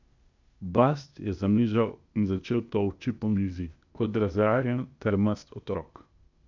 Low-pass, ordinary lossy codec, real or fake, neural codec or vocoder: 7.2 kHz; none; fake; codec, 16 kHz, 0.8 kbps, ZipCodec